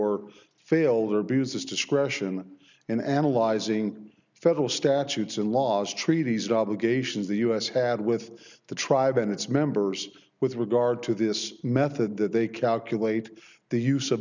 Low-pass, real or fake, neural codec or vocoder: 7.2 kHz; real; none